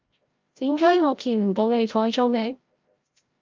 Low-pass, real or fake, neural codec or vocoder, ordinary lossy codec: 7.2 kHz; fake; codec, 16 kHz, 0.5 kbps, FreqCodec, larger model; Opus, 24 kbps